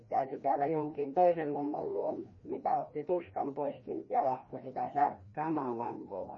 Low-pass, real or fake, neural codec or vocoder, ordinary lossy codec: 7.2 kHz; fake; codec, 16 kHz, 1 kbps, FreqCodec, larger model; MP3, 32 kbps